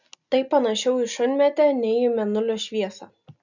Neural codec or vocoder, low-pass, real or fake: none; 7.2 kHz; real